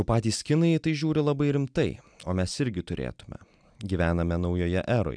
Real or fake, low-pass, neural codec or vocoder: real; 9.9 kHz; none